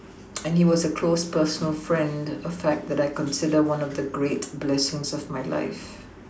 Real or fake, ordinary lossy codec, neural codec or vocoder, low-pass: real; none; none; none